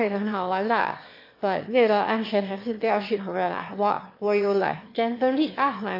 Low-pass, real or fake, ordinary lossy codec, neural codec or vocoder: 5.4 kHz; fake; MP3, 32 kbps; autoencoder, 22.05 kHz, a latent of 192 numbers a frame, VITS, trained on one speaker